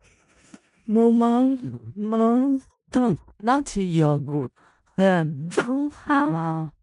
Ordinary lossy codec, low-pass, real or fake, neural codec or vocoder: none; 10.8 kHz; fake; codec, 16 kHz in and 24 kHz out, 0.4 kbps, LongCat-Audio-Codec, four codebook decoder